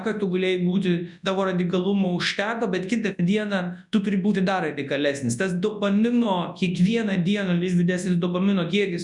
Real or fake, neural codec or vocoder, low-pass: fake; codec, 24 kHz, 0.9 kbps, WavTokenizer, large speech release; 10.8 kHz